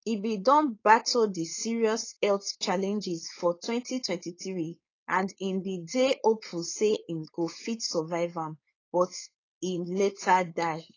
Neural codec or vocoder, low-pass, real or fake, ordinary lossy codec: codec, 16 kHz, 4.8 kbps, FACodec; 7.2 kHz; fake; AAC, 32 kbps